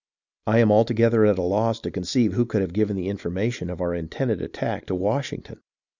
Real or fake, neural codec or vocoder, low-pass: real; none; 7.2 kHz